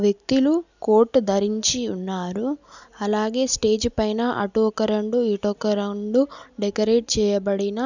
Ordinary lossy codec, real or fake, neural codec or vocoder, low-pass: none; real; none; 7.2 kHz